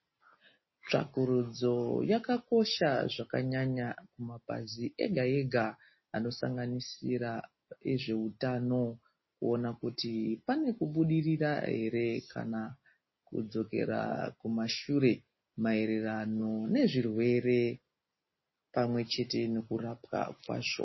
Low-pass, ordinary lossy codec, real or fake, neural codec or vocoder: 7.2 kHz; MP3, 24 kbps; real; none